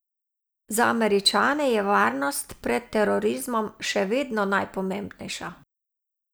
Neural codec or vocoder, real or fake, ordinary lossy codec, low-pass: none; real; none; none